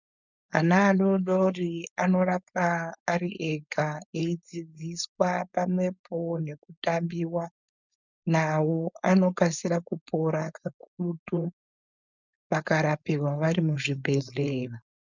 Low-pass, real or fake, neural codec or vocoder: 7.2 kHz; fake; codec, 16 kHz, 4.8 kbps, FACodec